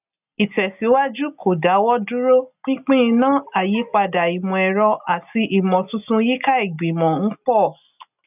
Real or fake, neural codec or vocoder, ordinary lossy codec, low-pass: real; none; none; 3.6 kHz